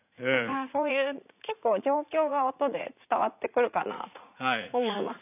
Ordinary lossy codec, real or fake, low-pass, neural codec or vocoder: none; fake; 3.6 kHz; vocoder, 44.1 kHz, 80 mel bands, Vocos